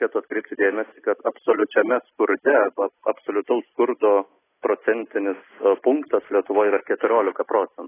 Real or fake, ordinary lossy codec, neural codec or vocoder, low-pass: real; AAC, 16 kbps; none; 3.6 kHz